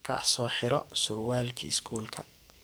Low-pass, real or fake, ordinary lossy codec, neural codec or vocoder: none; fake; none; codec, 44.1 kHz, 2.6 kbps, SNAC